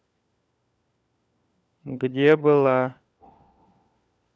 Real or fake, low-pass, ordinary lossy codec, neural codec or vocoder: fake; none; none; codec, 16 kHz, 4 kbps, FunCodec, trained on LibriTTS, 50 frames a second